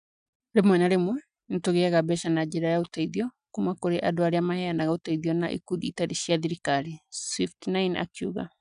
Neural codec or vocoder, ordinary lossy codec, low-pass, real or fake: none; none; 9.9 kHz; real